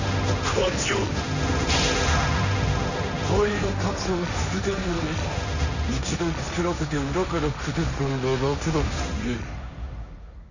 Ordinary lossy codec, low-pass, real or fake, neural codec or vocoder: none; 7.2 kHz; fake; codec, 16 kHz, 1.1 kbps, Voila-Tokenizer